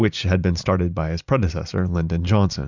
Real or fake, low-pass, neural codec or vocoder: fake; 7.2 kHz; vocoder, 44.1 kHz, 128 mel bands every 256 samples, BigVGAN v2